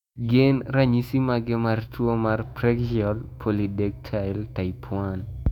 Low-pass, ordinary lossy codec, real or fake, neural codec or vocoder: 19.8 kHz; none; fake; autoencoder, 48 kHz, 128 numbers a frame, DAC-VAE, trained on Japanese speech